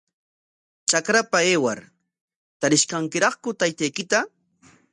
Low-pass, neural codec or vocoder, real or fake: 10.8 kHz; none; real